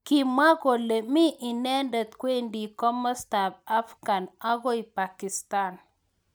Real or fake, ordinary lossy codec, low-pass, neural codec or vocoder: real; none; none; none